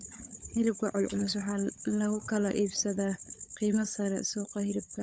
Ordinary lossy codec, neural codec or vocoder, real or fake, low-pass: none; codec, 16 kHz, 16 kbps, FunCodec, trained on LibriTTS, 50 frames a second; fake; none